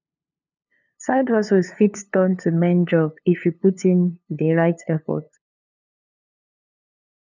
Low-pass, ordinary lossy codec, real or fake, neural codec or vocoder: 7.2 kHz; none; fake; codec, 16 kHz, 2 kbps, FunCodec, trained on LibriTTS, 25 frames a second